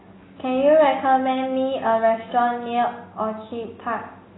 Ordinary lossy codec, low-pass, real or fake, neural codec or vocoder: AAC, 16 kbps; 7.2 kHz; real; none